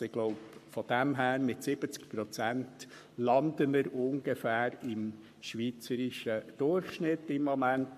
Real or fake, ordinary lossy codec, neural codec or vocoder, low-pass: fake; MP3, 64 kbps; codec, 44.1 kHz, 7.8 kbps, Pupu-Codec; 14.4 kHz